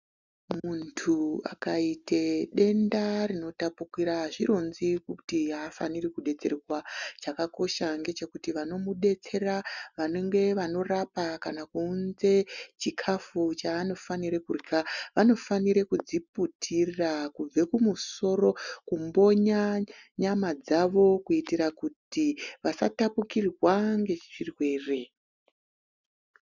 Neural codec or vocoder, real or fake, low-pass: none; real; 7.2 kHz